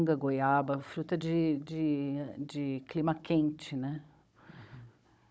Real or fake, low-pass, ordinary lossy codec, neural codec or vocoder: fake; none; none; codec, 16 kHz, 16 kbps, FunCodec, trained on Chinese and English, 50 frames a second